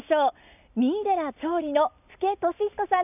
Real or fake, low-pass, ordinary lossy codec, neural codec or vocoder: real; 3.6 kHz; none; none